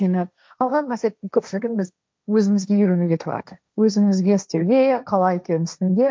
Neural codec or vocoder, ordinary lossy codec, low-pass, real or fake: codec, 16 kHz, 1.1 kbps, Voila-Tokenizer; none; none; fake